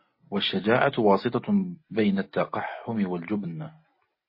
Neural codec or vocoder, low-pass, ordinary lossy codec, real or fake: none; 5.4 kHz; MP3, 24 kbps; real